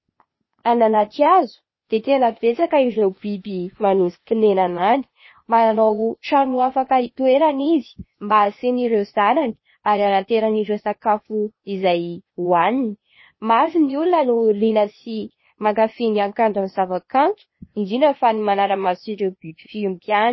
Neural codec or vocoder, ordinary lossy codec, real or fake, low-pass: codec, 16 kHz, 0.8 kbps, ZipCodec; MP3, 24 kbps; fake; 7.2 kHz